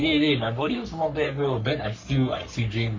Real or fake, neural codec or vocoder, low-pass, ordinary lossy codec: fake; codec, 44.1 kHz, 3.4 kbps, Pupu-Codec; 7.2 kHz; MP3, 48 kbps